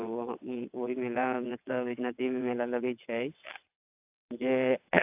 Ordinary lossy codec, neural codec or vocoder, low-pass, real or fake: AAC, 32 kbps; vocoder, 22.05 kHz, 80 mel bands, WaveNeXt; 3.6 kHz; fake